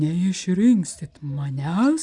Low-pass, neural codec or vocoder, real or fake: 10.8 kHz; none; real